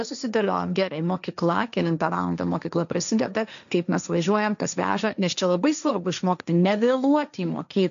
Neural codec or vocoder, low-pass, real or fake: codec, 16 kHz, 1.1 kbps, Voila-Tokenizer; 7.2 kHz; fake